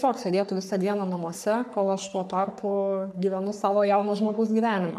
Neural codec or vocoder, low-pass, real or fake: codec, 44.1 kHz, 3.4 kbps, Pupu-Codec; 14.4 kHz; fake